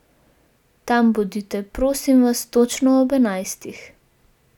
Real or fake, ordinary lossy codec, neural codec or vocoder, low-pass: real; none; none; 19.8 kHz